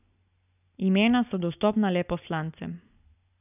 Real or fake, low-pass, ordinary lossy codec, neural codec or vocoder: real; 3.6 kHz; none; none